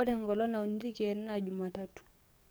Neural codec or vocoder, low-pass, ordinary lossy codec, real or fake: codec, 44.1 kHz, 7.8 kbps, DAC; none; none; fake